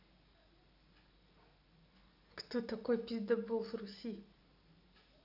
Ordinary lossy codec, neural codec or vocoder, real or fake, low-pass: none; none; real; 5.4 kHz